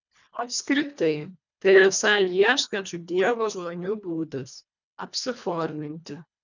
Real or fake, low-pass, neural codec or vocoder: fake; 7.2 kHz; codec, 24 kHz, 1.5 kbps, HILCodec